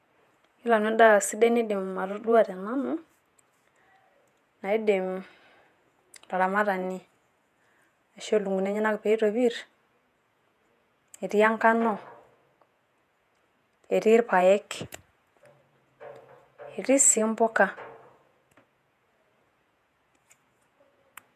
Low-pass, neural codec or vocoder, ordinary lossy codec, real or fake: 14.4 kHz; vocoder, 48 kHz, 128 mel bands, Vocos; none; fake